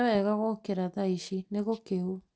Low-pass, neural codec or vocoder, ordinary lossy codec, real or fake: none; none; none; real